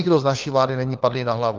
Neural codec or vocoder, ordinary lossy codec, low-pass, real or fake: codec, 16 kHz, 4 kbps, FunCodec, trained on LibriTTS, 50 frames a second; Opus, 32 kbps; 7.2 kHz; fake